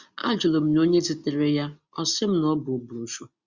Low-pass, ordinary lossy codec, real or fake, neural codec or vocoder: 7.2 kHz; Opus, 64 kbps; real; none